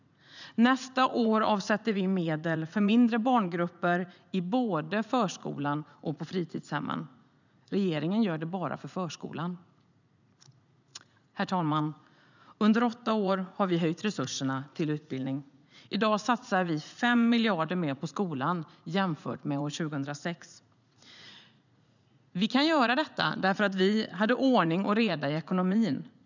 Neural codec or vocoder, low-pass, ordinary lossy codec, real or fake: vocoder, 44.1 kHz, 80 mel bands, Vocos; 7.2 kHz; none; fake